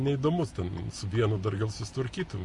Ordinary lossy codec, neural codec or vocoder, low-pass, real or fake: MP3, 48 kbps; none; 10.8 kHz; real